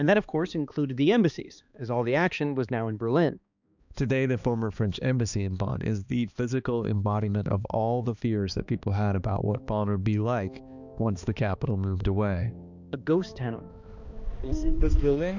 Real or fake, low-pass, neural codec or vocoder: fake; 7.2 kHz; codec, 16 kHz, 2 kbps, X-Codec, HuBERT features, trained on balanced general audio